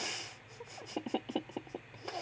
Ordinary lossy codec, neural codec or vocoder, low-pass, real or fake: none; none; none; real